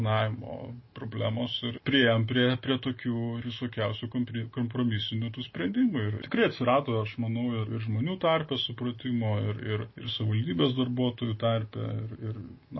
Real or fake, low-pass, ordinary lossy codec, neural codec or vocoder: real; 7.2 kHz; MP3, 24 kbps; none